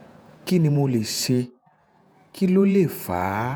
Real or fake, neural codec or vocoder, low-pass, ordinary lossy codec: fake; vocoder, 48 kHz, 128 mel bands, Vocos; none; none